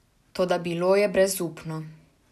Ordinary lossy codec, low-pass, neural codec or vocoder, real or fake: none; 14.4 kHz; none; real